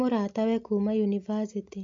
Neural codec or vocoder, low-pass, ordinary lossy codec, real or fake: none; 7.2 kHz; MP3, 48 kbps; real